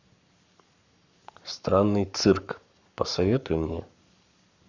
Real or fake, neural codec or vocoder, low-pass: fake; codec, 44.1 kHz, 7.8 kbps, Pupu-Codec; 7.2 kHz